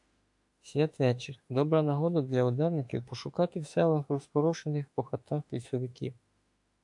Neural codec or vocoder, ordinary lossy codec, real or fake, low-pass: autoencoder, 48 kHz, 32 numbers a frame, DAC-VAE, trained on Japanese speech; AAC, 64 kbps; fake; 10.8 kHz